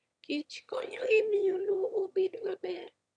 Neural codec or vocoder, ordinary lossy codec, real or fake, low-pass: autoencoder, 22.05 kHz, a latent of 192 numbers a frame, VITS, trained on one speaker; none; fake; 9.9 kHz